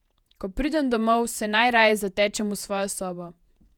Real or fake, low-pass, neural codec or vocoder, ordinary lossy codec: fake; 19.8 kHz; vocoder, 48 kHz, 128 mel bands, Vocos; none